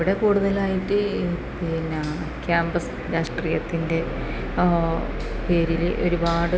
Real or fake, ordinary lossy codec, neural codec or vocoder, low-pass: real; none; none; none